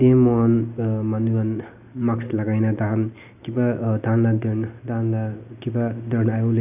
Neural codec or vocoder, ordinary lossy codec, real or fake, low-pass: none; none; real; 3.6 kHz